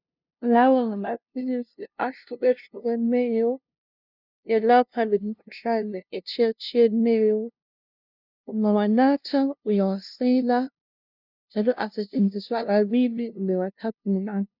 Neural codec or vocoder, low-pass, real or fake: codec, 16 kHz, 0.5 kbps, FunCodec, trained on LibriTTS, 25 frames a second; 5.4 kHz; fake